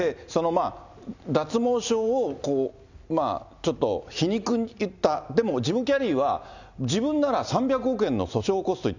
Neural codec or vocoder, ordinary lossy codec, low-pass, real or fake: none; none; 7.2 kHz; real